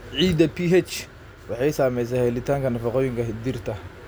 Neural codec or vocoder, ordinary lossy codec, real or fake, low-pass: none; none; real; none